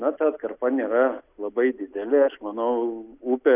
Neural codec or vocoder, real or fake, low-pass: none; real; 3.6 kHz